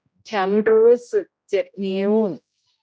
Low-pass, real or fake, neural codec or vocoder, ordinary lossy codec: none; fake; codec, 16 kHz, 0.5 kbps, X-Codec, HuBERT features, trained on general audio; none